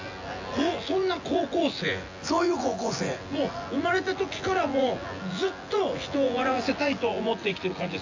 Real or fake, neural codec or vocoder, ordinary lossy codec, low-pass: fake; vocoder, 24 kHz, 100 mel bands, Vocos; AAC, 48 kbps; 7.2 kHz